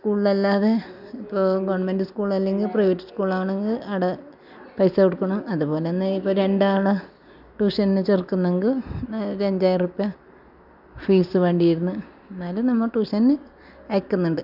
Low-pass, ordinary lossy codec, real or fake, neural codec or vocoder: 5.4 kHz; none; real; none